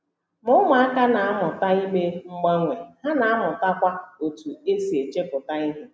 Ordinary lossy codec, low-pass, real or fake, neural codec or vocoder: none; none; real; none